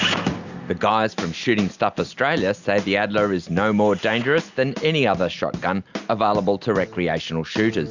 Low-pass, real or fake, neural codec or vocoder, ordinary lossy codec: 7.2 kHz; real; none; Opus, 64 kbps